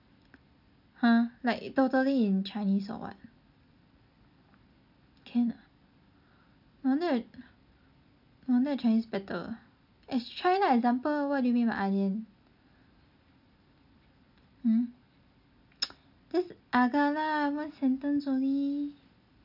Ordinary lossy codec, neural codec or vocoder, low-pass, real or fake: none; none; 5.4 kHz; real